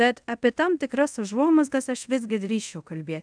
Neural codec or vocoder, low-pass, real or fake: codec, 24 kHz, 0.5 kbps, DualCodec; 9.9 kHz; fake